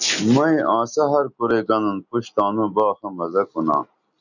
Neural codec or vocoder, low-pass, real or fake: none; 7.2 kHz; real